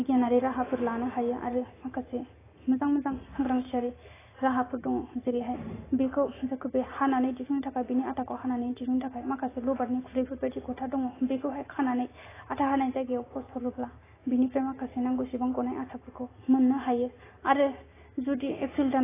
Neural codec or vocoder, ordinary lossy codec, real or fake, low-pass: none; AAC, 16 kbps; real; 3.6 kHz